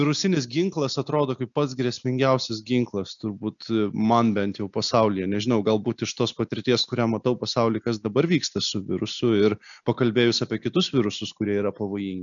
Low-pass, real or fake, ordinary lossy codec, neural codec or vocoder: 7.2 kHz; real; MP3, 96 kbps; none